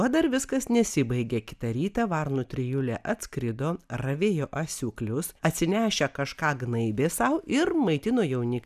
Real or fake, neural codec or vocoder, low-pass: real; none; 14.4 kHz